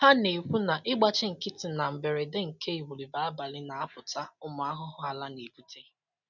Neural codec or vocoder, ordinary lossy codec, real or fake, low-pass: none; none; real; 7.2 kHz